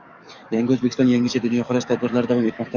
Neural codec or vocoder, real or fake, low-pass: codec, 44.1 kHz, 7.8 kbps, DAC; fake; 7.2 kHz